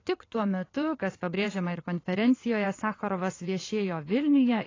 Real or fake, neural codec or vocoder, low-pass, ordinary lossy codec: fake; vocoder, 22.05 kHz, 80 mel bands, WaveNeXt; 7.2 kHz; AAC, 32 kbps